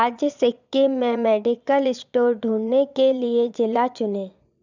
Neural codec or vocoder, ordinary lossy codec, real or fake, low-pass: vocoder, 22.05 kHz, 80 mel bands, Vocos; none; fake; 7.2 kHz